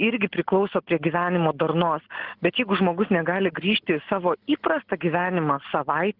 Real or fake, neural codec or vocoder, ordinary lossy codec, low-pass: real; none; Opus, 16 kbps; 5.4 kHz